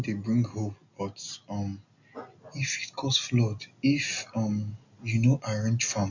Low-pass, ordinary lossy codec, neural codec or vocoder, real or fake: 7.2 kHz; none; none; real